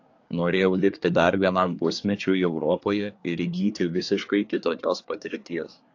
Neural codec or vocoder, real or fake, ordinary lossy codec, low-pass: codec, 24 kHz, 1 kbps, SNAC; fake; AAC, 48 kbps; 7.2 kHz